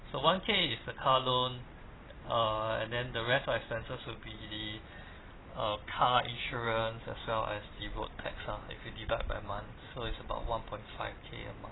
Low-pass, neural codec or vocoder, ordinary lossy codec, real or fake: 7.2 kHz; none; AAC, 16 kbps; real